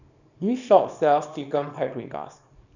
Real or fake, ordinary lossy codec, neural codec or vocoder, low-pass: fake; none; codec, 24 kHz, 0.9 kbps, WavTokenizer, small release; 7.2 kHz